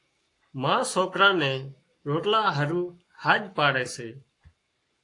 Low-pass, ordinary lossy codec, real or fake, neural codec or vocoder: 10.8 kHz; AAC, 48 kbps; fake; codec, 44.1 kHz, 7.8 kbps, Pupu-Codec